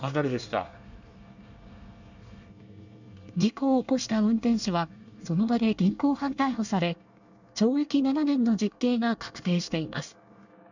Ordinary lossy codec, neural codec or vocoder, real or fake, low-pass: none; codec, 24 kHz, 1 kbps, SNAC; fake; 7.2 kHz